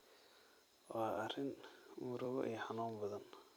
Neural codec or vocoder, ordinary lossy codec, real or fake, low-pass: vocoder, 44.1 kHz, 128 mel bands every 256 samples, BigVGAN v2; none; fake; none